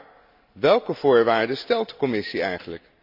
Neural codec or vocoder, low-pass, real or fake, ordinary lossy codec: none; 5.4 kHz; real; none